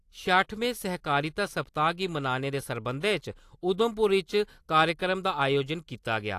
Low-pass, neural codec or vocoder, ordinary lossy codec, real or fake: 14.4 kHz; none; AAC, 64 kbps; real